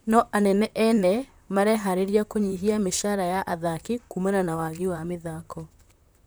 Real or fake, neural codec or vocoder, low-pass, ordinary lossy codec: fake; vocoder, 44.1 kHz, 128 mel bands, Pupu-Vocoder; none; none